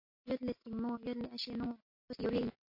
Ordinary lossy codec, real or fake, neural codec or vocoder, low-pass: MP3, 32 kbps; real; none; 5.4 kHz